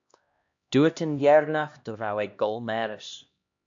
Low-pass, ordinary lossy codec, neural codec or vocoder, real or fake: 7.2 kHz; AAC, 64 kbps; codec, 16 kHz, 1 kbps, X-Codec, HuBERT features, trained on LibriSpeech; fake